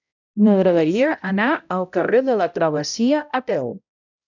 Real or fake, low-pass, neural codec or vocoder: fake; 7.2 kHz; codec, 16 kHz, 0.5 kbps, X-Codec, HuBERT features, trained on balanced general audio